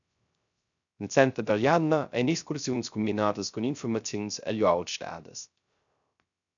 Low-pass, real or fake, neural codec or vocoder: 7.2 kHz; fake; codec, 16 kHz, 0.3 kbps, FocalCodec